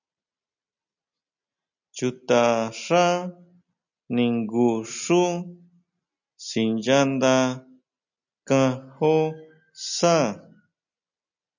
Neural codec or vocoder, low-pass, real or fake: none; 7.2 kHz; real